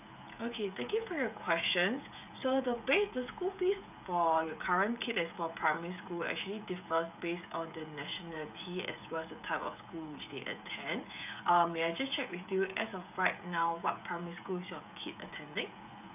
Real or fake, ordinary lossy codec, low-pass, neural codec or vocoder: fake; none; 3.6 kHz; codec, 16 kHz, 16 kbps, FreqCodec, smaller model